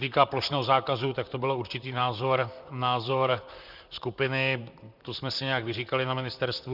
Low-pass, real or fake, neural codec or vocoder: 5.4 kHz; fake; vocoder, 44.1 kHz, 128 mel bands, Pupu-Vocoder